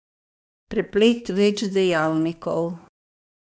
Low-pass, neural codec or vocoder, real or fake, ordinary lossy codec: none; codec, 16 kHz, 2 kbps, X-Codec, HuBERT features, trained on balanced general audio; fake; none